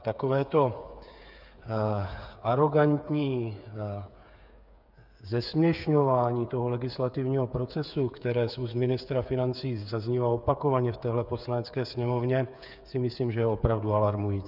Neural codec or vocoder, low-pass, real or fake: codec, 16 kHz, 8 kbps, FreqCodec, smaller model; 5.4 kHz; fake